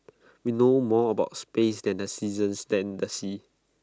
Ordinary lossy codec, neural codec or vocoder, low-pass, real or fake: none; none; none; real